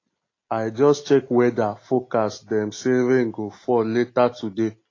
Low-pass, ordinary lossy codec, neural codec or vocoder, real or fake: 7.2 kHz; AAC, 32 kbps; none; real